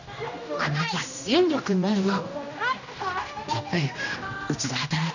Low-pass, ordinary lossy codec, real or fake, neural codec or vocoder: 7.2 kHz; none; fake; codec, 16 kHz, 1 kbps, X-Codec, HuBERT features, trained on general audio